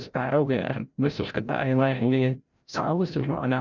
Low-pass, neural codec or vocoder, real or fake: 7.2 kHz; codec, 16 kHz, 0.5 kbps, FreqCodec, larger model; fake